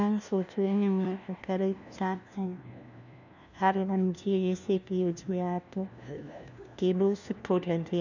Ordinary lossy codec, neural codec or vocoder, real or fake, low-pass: none; codec, 16 kHz, 1 kbps, FunCodec, trained on LibriTTS, 50 frames a second; fake; 7.2 kHz